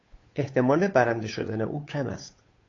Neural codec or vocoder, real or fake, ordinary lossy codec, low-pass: codec, 16 kHz, 8 kbps, FunCodec, trained on Chinese and English, 25 frames a second; fake; AAC, 32 kbps; 7.2 kHz